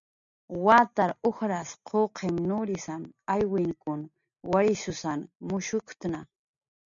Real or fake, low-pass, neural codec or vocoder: real; 7.2 kHz; none